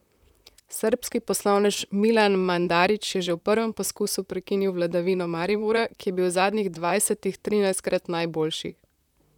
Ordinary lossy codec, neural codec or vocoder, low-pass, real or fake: none; vocoder, 44.1 kHz, 128 mel bands, Pupu-Vocoder; 19.8 kHz; fake